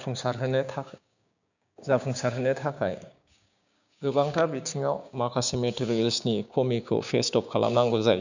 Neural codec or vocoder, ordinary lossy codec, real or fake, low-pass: codec, 16 kHz, 6 kbps, DAC; none; fake; 7.2 kHz